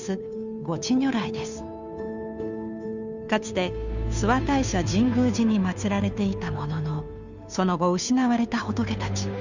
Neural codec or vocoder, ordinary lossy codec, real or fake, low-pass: codec, 16 kHz, 2 kbps, FunCodec, trained on Chinese and English, 25 frames a second; none; fake; 7.2 kHz